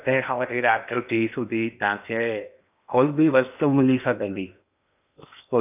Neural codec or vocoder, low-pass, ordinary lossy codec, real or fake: codec, 16 kHz in and 24 kHz out, 0.8 kbps, FocalCodec, streaming, 65536 codes; 3.6 kHz; none; fake